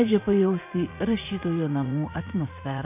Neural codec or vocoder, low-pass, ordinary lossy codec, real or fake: vocoder, 44.1 kHz, 80 mel bands, Vocos; 3.6 kHz; MP3, 24 kbps; fake